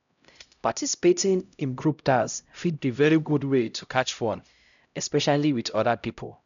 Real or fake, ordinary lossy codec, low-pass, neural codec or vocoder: fake; MP3, 96 kbps; 7.2 kHz; codec, 16 kHz, 0.5 kbps, X-Codec, HuBERT features, trained on LibriSpeech